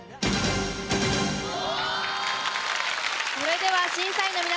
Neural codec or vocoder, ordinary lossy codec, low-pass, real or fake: none; none; none; real